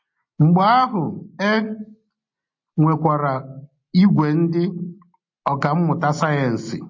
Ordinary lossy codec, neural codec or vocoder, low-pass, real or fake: MP3, 32 kbps; none; 7.2 kHz; real